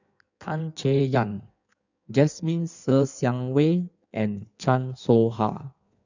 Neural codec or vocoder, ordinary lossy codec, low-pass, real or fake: codec, 16 kHz in and 24 kHz out, 1.1 kbps, FireRedTTS-2 codec; none; 7.2 kHz; fake